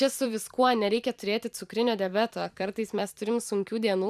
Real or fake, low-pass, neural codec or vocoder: fake; 14.4 kHz; vocoder, 44.1 kHz, 128 mel bands every 256 samples, BigVGAN v2